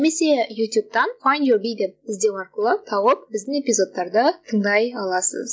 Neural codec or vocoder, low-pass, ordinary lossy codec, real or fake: none; none; none; real